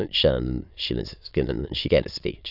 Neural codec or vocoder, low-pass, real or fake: autoencoder, 22.05 kHz, a latent of 192 numbers a frame, VITS, trained on many speakers; 5.4 kHz; fake